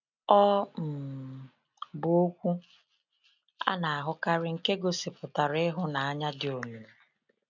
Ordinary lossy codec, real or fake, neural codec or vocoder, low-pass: none; real; none; 7.2 kHz